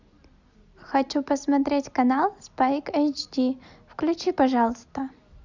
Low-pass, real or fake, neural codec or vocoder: 7.2 kHz; real; none